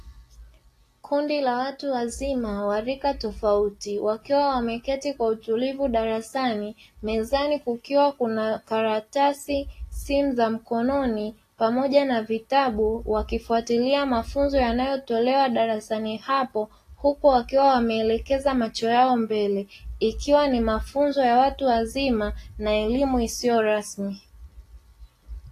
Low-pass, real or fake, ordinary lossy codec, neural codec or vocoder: 14.4 kHz; real; AAC, 48 kbps; none